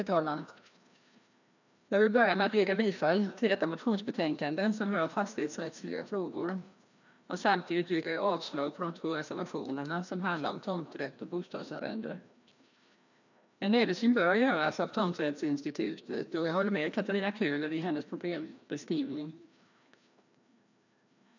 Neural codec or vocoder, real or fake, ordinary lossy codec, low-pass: codec, 16 kHz, 1 kbps, FreqCodec, larger model; fake; none; 7.2 kHz